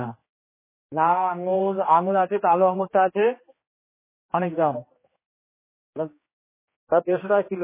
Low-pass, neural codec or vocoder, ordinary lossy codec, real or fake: 3.6 kHz; codec, 16 kHz, 2 kbps, X-Codec, HuBERT features, trained on general audio; MP3, 16 kbps; fake